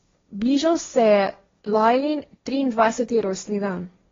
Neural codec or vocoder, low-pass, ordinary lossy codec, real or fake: codec, 16 kHz, 1.1 kbps, Voila-Tokenizer; 7.2 kHz; AAC, 24 kbps; fake